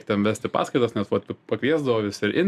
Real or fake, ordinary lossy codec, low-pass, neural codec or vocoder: real; MP3, 96 kbps; 14.4 kHz; none